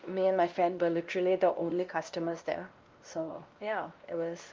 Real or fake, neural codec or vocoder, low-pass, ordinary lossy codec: fake; codec, 16 kHz, 1 kbps, X-Codec, WavLM features, trained on Multilingual LibriSpeech; 7.2 kHz; Opus, 32 kbps